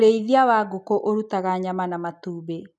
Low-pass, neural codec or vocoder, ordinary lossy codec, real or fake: none; none; none; real